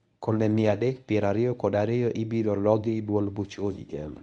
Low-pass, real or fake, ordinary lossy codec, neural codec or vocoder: 10.8 kHz; fake; none; codec, 24 kHz, 0.9 kbps, WavTokenizer, medium speech release version 1